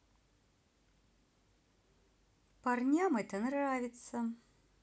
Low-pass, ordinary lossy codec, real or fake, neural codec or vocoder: none; none; real; none